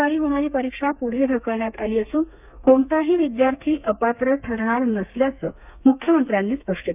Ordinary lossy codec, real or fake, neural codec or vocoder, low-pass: none; fake; codec, 32 kHz, 1.9 kbps, SNAC; 3.6 kHz